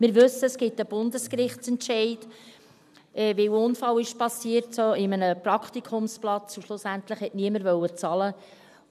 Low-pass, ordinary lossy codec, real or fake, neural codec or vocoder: 14.4 kHz; none; real; none